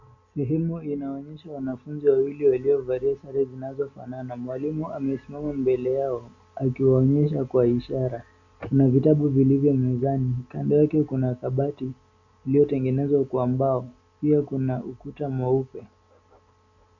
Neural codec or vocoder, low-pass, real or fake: none; 7.2 kHz; real